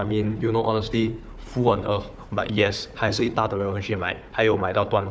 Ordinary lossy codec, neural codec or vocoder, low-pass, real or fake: none; codec, 16 kHz, 4 kbps, FunCodec, trained on Chinese and English, 50 frames a second; none; fake